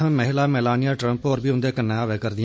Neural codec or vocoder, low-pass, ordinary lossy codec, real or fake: none; none; none; real